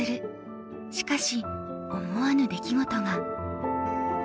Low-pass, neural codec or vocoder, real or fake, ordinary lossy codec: none; none; real; none